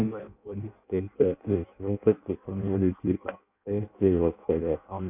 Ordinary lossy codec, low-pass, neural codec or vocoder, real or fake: none; 3.6 kHz; codec, 16 kHz in and 24 kHz out, 0.6 kbps, FireRedTTS-2 codec; fake